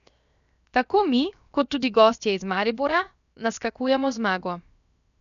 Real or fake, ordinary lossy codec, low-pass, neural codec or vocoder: fake; none; 7.2 kHz; codec, 16 kHz, 0.7 kbps, FocalCodec